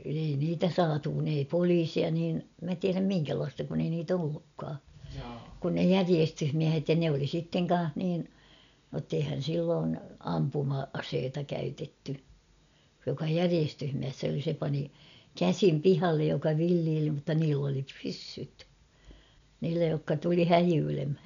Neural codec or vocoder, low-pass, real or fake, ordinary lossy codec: none; 7.2 kHz; real; none